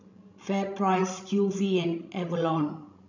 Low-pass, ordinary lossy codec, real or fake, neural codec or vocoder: 7.2 kHz; none; fake; codec, 16 kHz, 16 kbps, FreqCodec, larger model